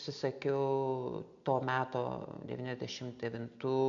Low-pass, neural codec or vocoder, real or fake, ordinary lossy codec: 7.2 kHz; none; real; MP3, 48 kbps